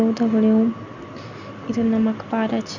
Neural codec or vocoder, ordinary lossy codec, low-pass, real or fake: none; none; 7.2 kHz; real